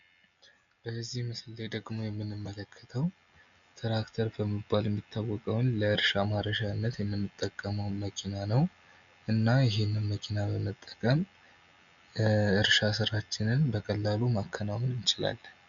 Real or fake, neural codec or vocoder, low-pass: real; none; 7.2 kHz